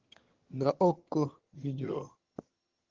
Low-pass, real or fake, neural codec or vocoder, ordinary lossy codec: 7.2 kHz; fake; vocoder, 22.05 kHz, 80 mel bands, HiFi-GAN; Opus, 16 kbps